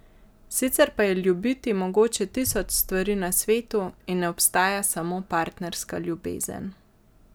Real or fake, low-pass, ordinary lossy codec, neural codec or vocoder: real; none; none; none